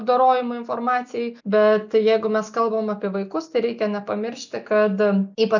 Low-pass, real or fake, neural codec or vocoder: 7.2 kHz; real; none